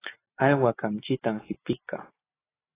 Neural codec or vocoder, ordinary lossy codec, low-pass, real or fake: none; AAC, 16 kbps; 3.6 kHz; real